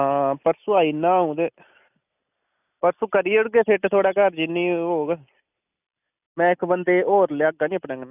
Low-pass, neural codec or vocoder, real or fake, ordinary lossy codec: 3.6 kHz; none; real; none